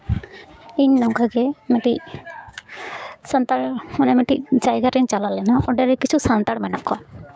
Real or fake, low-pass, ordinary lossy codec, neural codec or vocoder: fake; none; none; codec, 16 kHz, 6 kbps, DAC